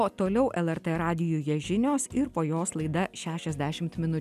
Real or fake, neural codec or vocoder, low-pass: real; none; 14.4 kHz